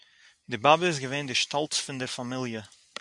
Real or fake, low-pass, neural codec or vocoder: real; 10.8 kHz; none